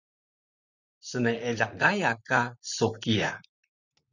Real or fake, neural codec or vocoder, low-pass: fake; vocoder, 44.1 kHz, 128 mel bands, Pupu-Vocoder; 7.2 kHz